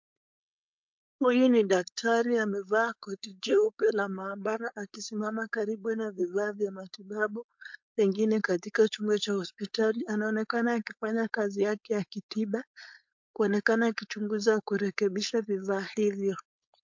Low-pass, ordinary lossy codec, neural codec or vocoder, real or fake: 7.2 kHz; MP3, 48 kbps; codec, 16 kHz, 4.8 kbps, FACodec; fake